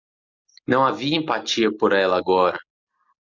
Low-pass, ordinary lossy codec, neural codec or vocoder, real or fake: 7.2 kHz; MP3, 64 kbps; none; real